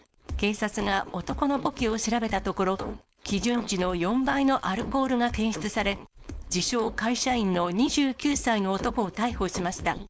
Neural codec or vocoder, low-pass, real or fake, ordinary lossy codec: codec, 16 kHz, 4.8 kbps, FACodec; none; fake; none